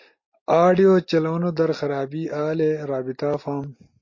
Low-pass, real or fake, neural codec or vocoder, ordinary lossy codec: 7.2 kHz; real; none; MP3, 32 kbps